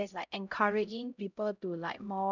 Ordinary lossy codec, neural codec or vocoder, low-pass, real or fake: none; codec, 16 kHz, 0.5 kbps, X-Codec, HuBERT features, trained on LibriSpeech; 7.2 kHz; fake